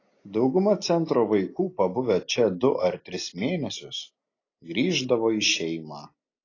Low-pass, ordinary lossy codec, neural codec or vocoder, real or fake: 7.2 kHz; AAC, 32 kbps; none; real